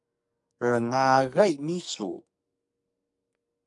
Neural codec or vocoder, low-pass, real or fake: codec, 44.1 kHz, 2.6 kbps, SNAC; 10.8 kHz; fake